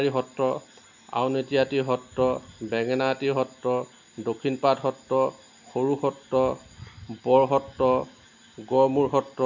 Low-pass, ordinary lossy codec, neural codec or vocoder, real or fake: 7.2 kHz; none; none; real